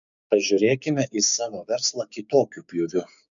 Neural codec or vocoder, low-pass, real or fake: codec, 16 kHz, 6 kbps, DAC; 7.2 kHz; fake